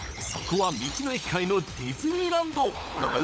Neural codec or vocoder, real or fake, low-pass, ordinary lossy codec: codec, 16 kHz, 16 kbps, FunCodec, trained on LibriTTS, 50 frames a second; fake; none; none